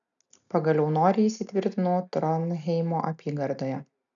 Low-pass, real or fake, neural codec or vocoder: 7.2 kHz; real; none